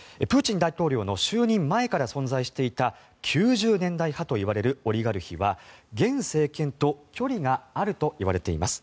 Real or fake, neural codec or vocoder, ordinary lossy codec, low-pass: real; none; none; none